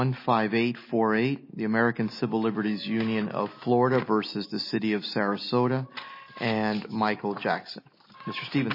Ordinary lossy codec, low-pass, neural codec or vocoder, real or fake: MP3, 24 kbps; 5.4 kHz; none; real